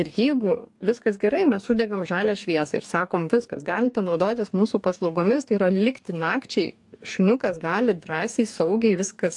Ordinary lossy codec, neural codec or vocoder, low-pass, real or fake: AAC, 64 kbps; codec, 44.1 kHz, 2.6 kbps, DAC; 10.8 kHz; fake